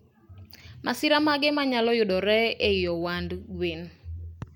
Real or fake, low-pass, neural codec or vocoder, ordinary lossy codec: real; 19.8 kHz; none; none